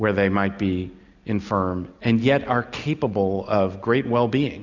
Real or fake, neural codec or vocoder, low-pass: real; none; 7.2 kHz